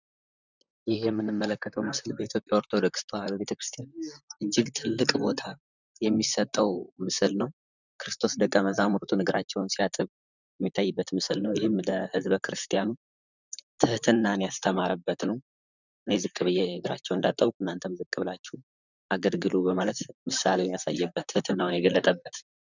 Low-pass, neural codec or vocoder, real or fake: 7.2 kHz; vocoder, 44.1 kHz, 128 mel bands, Pupu-Vocoder; fake